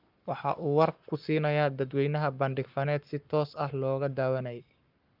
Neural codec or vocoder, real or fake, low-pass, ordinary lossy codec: codec, 44.1 kHz, 7.8 kbps, Pupu-Codec; fake; 5.4 kHz; Opus, 32 kbps